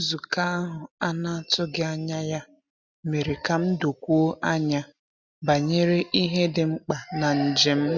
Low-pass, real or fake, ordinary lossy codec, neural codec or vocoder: none; real; none; none